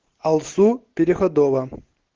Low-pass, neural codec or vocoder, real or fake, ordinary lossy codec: 7.2 kHz; none; real; Opus, 16 kbps